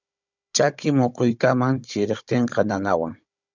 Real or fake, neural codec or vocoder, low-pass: fake; codec, 16 kHz, 16 kbps, FunCodec, trained on Chinese and English, 50 frames a second; 7.2 kHz